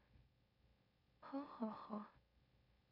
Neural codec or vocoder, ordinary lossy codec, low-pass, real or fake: autoencoder, 44.1 kHz, a latent of 192 numbers a frame, MeloTTS; none; 5.4 kHz; fake